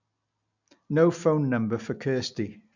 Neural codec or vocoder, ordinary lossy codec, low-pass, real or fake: none; none; 7.2 kHz; real